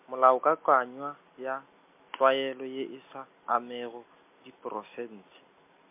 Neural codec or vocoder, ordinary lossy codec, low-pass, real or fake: none; MP3, 32 kbps; 3.6 kHz; real